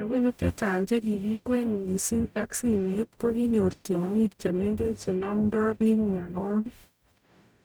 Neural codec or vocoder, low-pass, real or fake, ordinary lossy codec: codec, 44.1 kHz, 0.9 kbps, DAC; none; fake; none